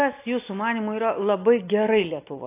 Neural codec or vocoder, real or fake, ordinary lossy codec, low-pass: none; real; AAC, 24 kbps; 3.6 kHz